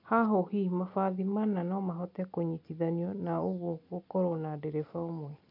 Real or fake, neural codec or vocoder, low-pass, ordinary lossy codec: real; none; 5.4 kHz; MP3, 48 kbps